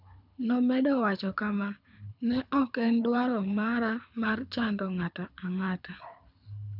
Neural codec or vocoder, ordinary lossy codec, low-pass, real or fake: codec, 24 kHz, 6 kbps, HILCodec; none; 5.4 kHz; fake